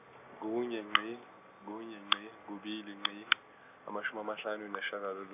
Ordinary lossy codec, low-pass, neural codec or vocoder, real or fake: none; 3.6 kHz; none; real